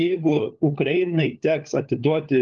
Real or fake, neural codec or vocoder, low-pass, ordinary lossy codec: fake; codec, 16 kHz, 4 kbps, FunCodec, trained on LibriTTS, 50 frames a second; 7.2 kHz; Opus, 32 kbps